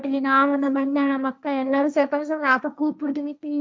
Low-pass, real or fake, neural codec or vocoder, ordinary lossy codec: none; fake; codec, 16 kHz, 1.1 kbps, Voila-Tokenizer; none